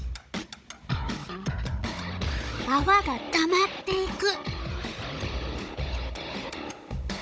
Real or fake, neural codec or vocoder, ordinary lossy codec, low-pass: fake; codec, 16 kHz, 16 kbps, FunCodec, trained on Chinese and English, 50 frames a second; none; none